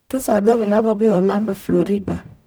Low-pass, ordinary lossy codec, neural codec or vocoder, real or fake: none; none; codec, 44.1 kHz, 0.9 kbps, DAC; fake